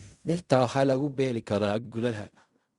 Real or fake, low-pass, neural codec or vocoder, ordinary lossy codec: fake; 10.8 kHz; codec, 16 kHz in and 24 kHz out, 0.4 kbps, LongCat-Audio-Codec, fine tuned four codebook decoder; Opus, 64 kbps